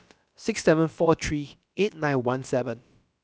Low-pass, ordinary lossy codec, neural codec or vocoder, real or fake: none; none; codec, 16 kHz, about 1 kbps, DyCAST, with the encoder's durations; fake